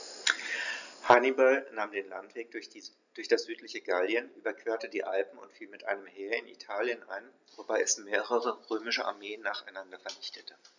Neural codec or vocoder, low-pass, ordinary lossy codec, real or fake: none; 7.2 kHz; none; real